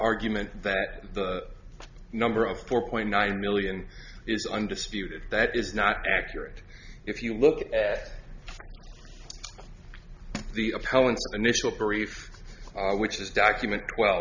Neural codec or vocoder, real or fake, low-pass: none; real; 7.2 kHz